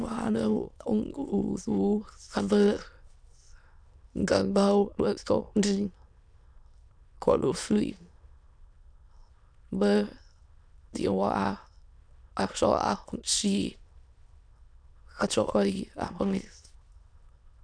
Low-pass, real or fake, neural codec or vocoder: 9.9 kHz; fake; autoencoder, 22.05 kHz, a latent of 192 numbers a frame, VITS, trained on many speakers